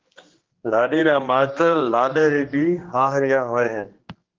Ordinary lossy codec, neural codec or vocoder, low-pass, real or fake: Opus, 16 kbps; codec, 16 kHz, 2 kbps, X-Codec, HuBERT features, trained on general audio; 7.2 kHz; fake